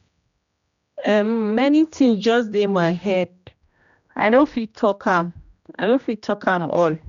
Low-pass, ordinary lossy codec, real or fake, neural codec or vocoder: 7.2 kHz; none; fake; codec, 16 kHz, 1 kbps, X-Codec, HuBERT features, trained on general audio